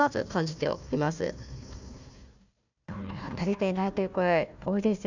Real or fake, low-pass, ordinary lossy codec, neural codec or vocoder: fake; 7.2 kHz; none; codec, 16 kHz, 1 kbps, FunCodec, trained on Chinese and English, 50 frames a second